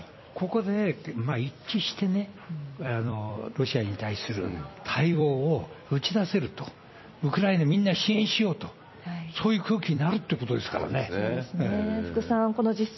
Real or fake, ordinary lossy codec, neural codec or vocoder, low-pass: fake; MP3, 24 kbps; vocoder, 44.1 kHz, 128 mel bands every 256 samples, BigVGAN v2; 7.2 kHz